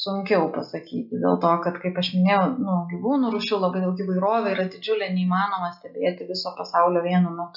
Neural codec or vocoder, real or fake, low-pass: none; real; 5.4 kHz